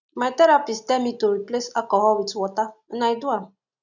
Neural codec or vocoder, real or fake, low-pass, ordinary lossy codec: none; real; 7.2 kHz; none